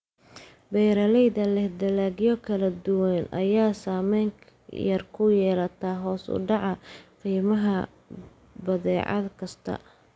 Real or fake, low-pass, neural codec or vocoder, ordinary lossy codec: real; none; none; none